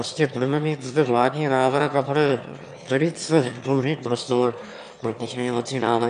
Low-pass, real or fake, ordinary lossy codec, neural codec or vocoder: 9.9 kHz; fake; AAC, 96 kbps; autoencoder, 22.05 kHz, a latent of 192 numbers a frame, VITS, trained on one speaker